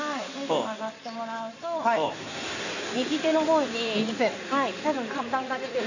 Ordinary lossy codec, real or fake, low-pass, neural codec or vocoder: none; fake; 7.2 kHz; codec, 16 kHz in and 24 kHz out, 2.2 kbps, FireRedTTS-2 codec